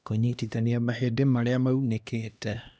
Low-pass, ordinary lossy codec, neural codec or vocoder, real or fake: none; none; codec, 16 kHz, 1 kbps, X-Codec, HuBERT features, trained on LibriSpeech; fake